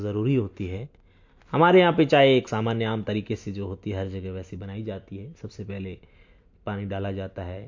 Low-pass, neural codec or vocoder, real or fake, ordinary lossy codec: 7.2 kHz; none; real; MP3, 48 kbps